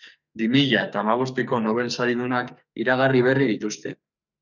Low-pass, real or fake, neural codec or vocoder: 7.2 kHz; fake; codec, 44.1 kHz, 2.6 kbps, SNAC